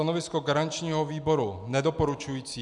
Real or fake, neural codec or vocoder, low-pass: real; none; 10.8 kHz